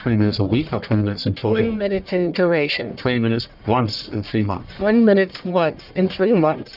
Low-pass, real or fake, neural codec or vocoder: 5.4 kHz; fake; codec, 44.1 kHz, 1.7 kbps, Pupu-Codec